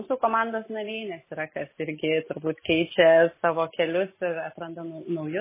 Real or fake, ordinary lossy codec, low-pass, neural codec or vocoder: real; MP3, 16 kbps; 3.6 kHz; none